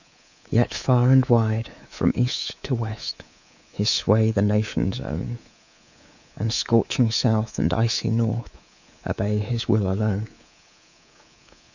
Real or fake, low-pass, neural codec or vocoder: fake; 7.2 kHz; codec, 24 kHz, 3.1 kbps, DualCodec